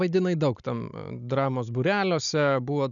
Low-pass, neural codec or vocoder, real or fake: 7.2 kHz; none; real